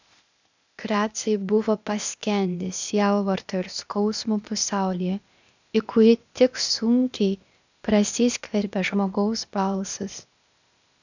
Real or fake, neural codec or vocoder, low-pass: fake; codec, 16 kHz, 0.8 kbps, ZipCodec; 7.2 kHz